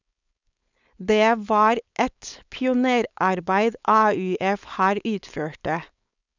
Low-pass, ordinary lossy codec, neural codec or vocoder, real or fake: 7.2 kHz; none; codec, 16 kHz, 4.8 kbps, FACodec; fake